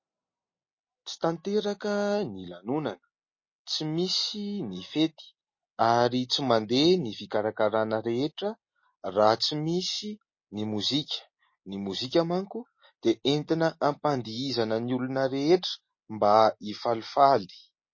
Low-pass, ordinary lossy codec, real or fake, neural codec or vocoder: 7.2 kHz; MP3, 32 kbps; real; none